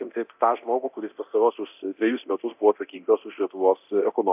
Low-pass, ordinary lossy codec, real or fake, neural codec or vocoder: 3.6 kHz; AAC, 32 kbps; fake; codec, 24 kHz, 0.9 kbps, DualCodec